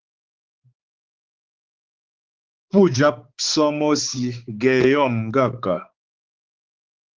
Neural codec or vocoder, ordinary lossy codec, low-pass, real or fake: codec, 16 kHz, 4 kbps, X-Codec, HuBERT features, trained on balanced general audio; Opus, 16 kbps; 7.2 kHz; fake